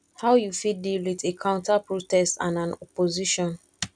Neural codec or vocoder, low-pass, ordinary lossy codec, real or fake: none; 9.9 kHz; none; real